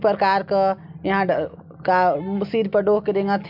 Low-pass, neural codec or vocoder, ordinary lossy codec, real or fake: 5.4 kHz; none; AAC, 48 kbps; real